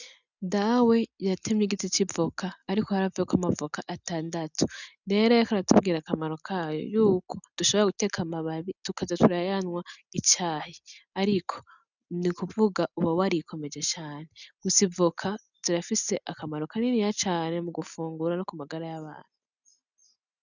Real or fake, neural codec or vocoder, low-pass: real; none; 7.2 kHz